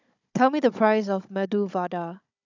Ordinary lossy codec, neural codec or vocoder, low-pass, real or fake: none; codec, 16 kHz, 16 kbps, FunCodec, trained on Chinese and English, 50 frames a second; 7.2 kHz; fake